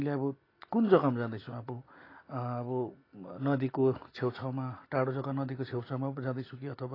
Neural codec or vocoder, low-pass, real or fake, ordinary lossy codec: none; 5.4 kHz; real; AAC, 24 kbps